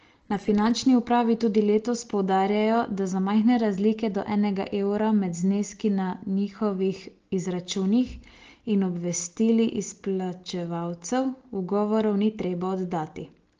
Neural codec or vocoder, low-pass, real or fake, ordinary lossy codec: none; 7.2 kHz; real; Opus, 16 kbps